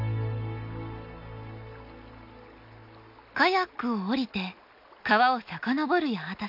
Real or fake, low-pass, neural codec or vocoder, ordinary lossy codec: real; 5.4 kHz; none; MP3, 48 kbps